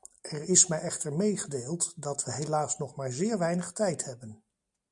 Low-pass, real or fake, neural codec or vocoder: 10.8 kHz; real; none